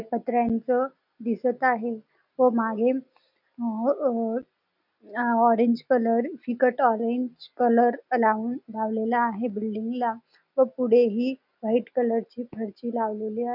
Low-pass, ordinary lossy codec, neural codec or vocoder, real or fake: 5.4 kHz; none; none; real